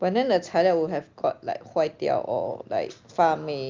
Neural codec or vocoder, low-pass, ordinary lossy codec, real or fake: none; 7.2 kHz; Opus, 32 kbps; real